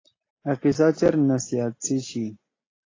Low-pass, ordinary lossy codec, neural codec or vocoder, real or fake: 7.2 kHz; AAC, 32 kbps; none; real